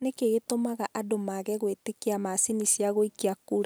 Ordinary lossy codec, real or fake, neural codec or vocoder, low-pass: none; real; none; none